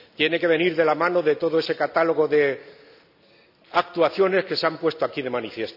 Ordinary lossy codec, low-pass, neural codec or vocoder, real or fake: none; 5.4 kHz; none; real